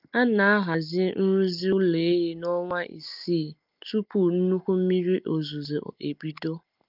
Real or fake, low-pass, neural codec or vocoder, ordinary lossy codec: real; 5.4 kHz; none; Opus, 24 kbps